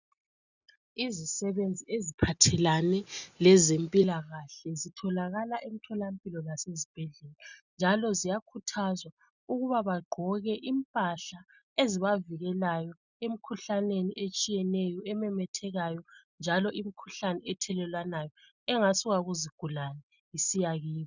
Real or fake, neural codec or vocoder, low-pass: real; none; 7.2 kHz